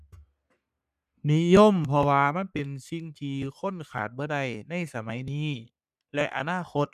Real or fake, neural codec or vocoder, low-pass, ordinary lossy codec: fake; codec, 44.1 kHz, 3.4 kbps, Pupu-Codec; 14.4 kHz; none